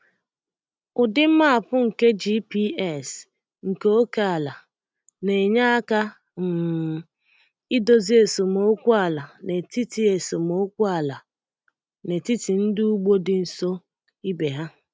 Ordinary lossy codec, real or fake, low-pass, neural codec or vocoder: none; real; none; none